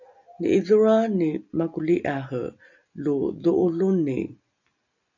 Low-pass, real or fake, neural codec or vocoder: 7.2 kHz; real; none